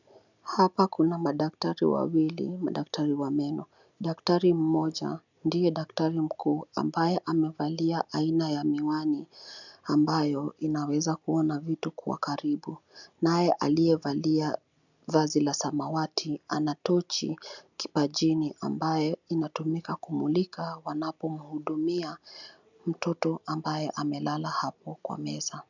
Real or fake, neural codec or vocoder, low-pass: real; none; 7.2 kHz